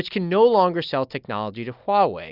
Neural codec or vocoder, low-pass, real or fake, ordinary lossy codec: none; 5.4 kHz; real; Opus, 64 kbps